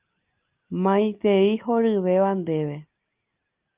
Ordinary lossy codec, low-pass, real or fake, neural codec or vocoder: Opus, 24 kbps; 3.6 kHz; real; none